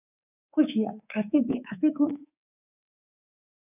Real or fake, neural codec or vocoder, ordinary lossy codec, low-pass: fake; codec, 24 kHz, 3.1 kbps, DualCodec; AAC, 32 kbps; 3.6 kHz